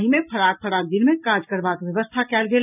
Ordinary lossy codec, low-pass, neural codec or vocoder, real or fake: none; 3.6 kHz; none; real